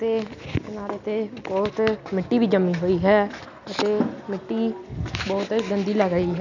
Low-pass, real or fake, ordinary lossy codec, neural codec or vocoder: 7.2 kHz; real; none; none